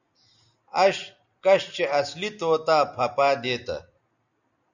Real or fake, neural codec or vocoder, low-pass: real; none; 7.2 kHz